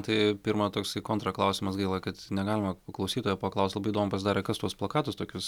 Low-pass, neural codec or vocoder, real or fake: 19.8 kHz; none; real